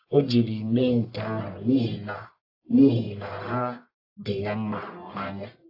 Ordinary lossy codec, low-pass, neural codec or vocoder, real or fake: AAC, 32 kbps; 5.4 kHz; codec, 44.1 kHz, 1.7 kbps, Pupu-Codec; fake